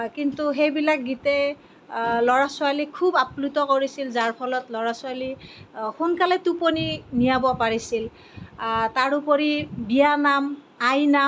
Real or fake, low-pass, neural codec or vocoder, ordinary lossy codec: real; none; none; none